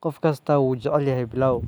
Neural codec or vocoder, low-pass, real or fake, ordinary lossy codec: none; none; real; none